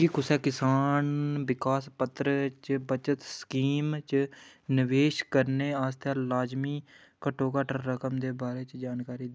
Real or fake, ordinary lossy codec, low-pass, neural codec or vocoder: real; none; none; none